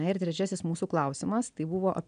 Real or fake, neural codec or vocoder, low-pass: real; none; 9.9 kHz